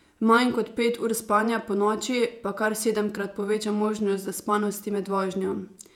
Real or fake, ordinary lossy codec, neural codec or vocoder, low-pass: fake; none; vocoder, 48 kHz, 128 mel bands, Vocos; 19.8 kHz